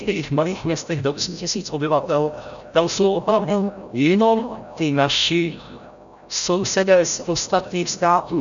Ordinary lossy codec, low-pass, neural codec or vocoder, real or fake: MP3, 96 kbps; 7.2 kHz; codec, 16 kHz, 0.5 kbps, FreqCodec, larger model; fake